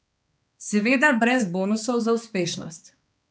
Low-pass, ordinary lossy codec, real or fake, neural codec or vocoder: none; none; fake; codec, 16 kHz, 2 kbps, X-Codec, HuBERT features, trained on balanced general audio